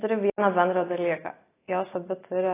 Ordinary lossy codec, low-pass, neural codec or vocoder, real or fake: AAC, 16 kbps; 3.6 kHz; none; real